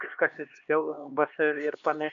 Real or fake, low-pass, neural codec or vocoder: fake; 7.2 kHz; codec, 16 kHz, 2 kbps, X-Codec, HuBERT features, trained on LibriSpeech